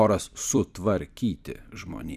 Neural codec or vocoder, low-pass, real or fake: vocoder, 44.1 kHz, 128 mel bands every 256 samples, BigVGAN v2; 14.4 kHz; fake